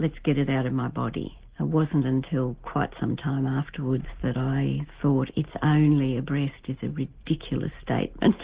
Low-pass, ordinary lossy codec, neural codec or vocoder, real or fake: 3.6 kHz; Opus, 16 kbps; none; real